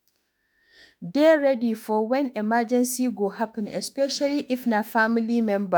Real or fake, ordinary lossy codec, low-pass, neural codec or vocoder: fake; none; none; autoencoder, 48 kHz, 32 numbers a frame, DAC-VAE, trained on Japanese speech